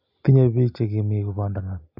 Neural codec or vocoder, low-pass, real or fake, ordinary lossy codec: none; 5.4 kHz; real; none